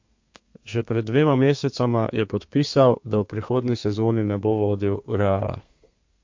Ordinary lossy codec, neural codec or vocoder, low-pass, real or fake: MP3, 48 kbps; codec, 32 kHz, 1.9 kbps, SNAC; 7.2 kHz; fake